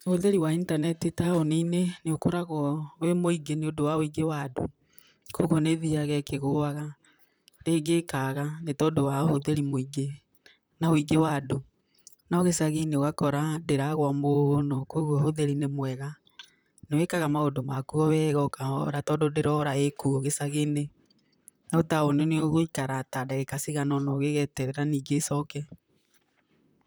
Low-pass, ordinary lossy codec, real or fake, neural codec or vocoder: none; none; fake; vocoder, 44.1 kHz, 128 mel bands, Pupu-Vocoder